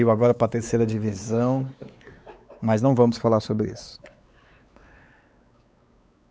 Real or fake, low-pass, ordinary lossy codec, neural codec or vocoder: fake; none; none; codec, 16 kHz, 4 kbps, X-Codec, WavLM features, trained on Multilingual LibriSpeech